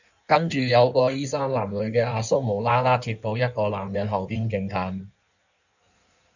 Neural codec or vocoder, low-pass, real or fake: codec, 16 kHz in and 24 kHz out, 1.1 kbps, FireRedTTS-2 codec; 7.2 kHz; fake